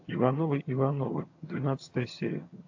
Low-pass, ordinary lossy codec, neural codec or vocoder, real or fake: 7.2 kHz; AAC, 48 kbps; vocoder, 22.05 kHz, 80 mel bands, HiFi-GAN; fake